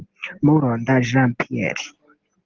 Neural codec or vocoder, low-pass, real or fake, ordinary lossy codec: none; 7.2 kHz; real; Opus, 16 kbps